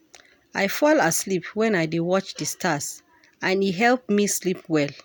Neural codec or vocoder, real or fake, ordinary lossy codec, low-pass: none; real; none; none